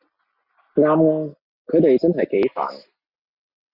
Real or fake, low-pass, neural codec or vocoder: real; 5.4 kHz; none